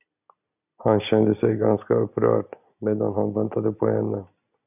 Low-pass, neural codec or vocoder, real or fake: 3.6 kHz; none; real